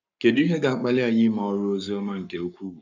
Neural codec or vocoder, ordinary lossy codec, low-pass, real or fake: codec, 44.1 kHz, 7.8 kbps, Pupu-Codec; none; 7.2 kHz; fake